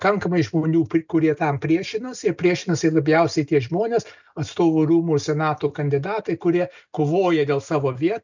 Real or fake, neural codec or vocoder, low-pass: real; none; 7.2 kHz